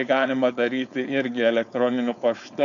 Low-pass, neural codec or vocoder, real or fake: 7.2 kHz; codec, 16 kHz, 4.8 kbps, FACodec; fake